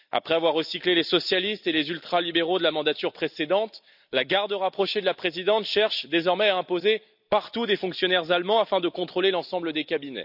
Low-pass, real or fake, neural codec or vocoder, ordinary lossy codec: 5.4 kHz; real; none; none